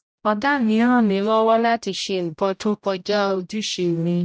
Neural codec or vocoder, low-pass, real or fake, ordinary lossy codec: codec, 16 kHz, 0.5 kbps, X-Codec, HuBERT features, trained on general audio; none; fake; none